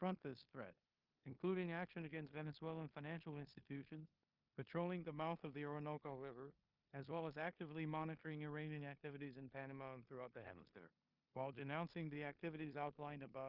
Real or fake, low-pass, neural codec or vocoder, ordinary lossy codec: fake; 5.4 kHz; codec, 16 kHz in and 24 kHz out, 0.9 kbps, LongCat-Audio-Codec, four codebook decoder; Opus, 32 kbps